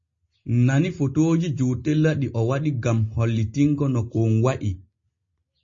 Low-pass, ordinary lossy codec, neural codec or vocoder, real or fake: 7.2 kHz; MP3, 32 kbps; none; real